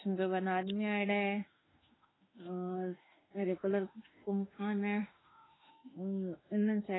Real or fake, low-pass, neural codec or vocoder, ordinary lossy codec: fake; 7.2 kHz; autoencoder, 48 kHz, 32 numbers a frame, DAC-VAE, trained on Japanese speech; AAC, 16 kbps